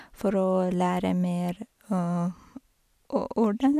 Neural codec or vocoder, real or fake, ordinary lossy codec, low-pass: vocoder, 44.1 kHz, 128 mel bands every 256 samples, BigVGAN v2; fake; none; 14.4 kHz